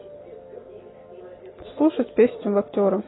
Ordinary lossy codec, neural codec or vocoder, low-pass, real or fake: AAC, 16 kbps; none; 7.2 kHz; real